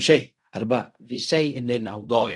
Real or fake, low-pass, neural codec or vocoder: fake; 10.8 kHz; codec, 16 kHz in and 24 kHz out, 0.4 kbps, LongCat-Audio-Codec, fine tuned four codebook decoder